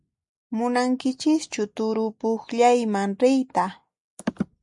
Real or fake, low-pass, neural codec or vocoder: real; 10.8 kHz; none